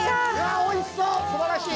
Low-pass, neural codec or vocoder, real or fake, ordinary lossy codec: none; none; real; none